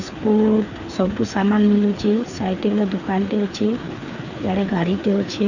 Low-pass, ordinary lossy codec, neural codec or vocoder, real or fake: 7.2 kHz; none; codec, 16 kHz, 4 kbps, FreqCodec, larger model; fake